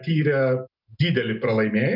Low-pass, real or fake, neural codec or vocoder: 5.4 kHz; real; none